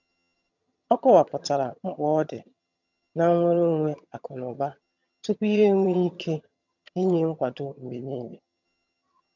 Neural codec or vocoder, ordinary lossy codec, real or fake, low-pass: vocoder, 22.05 kHz, 80 mel bands, HiFi-GAN; none; fake; 7.2 kHz